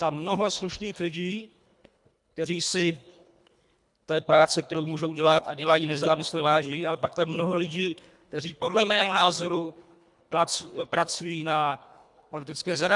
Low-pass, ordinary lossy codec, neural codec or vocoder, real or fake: 10.8 kHz; MP3, 96 kbps; codec, 24 kHz, 1.5 kbps, HILCodec; fake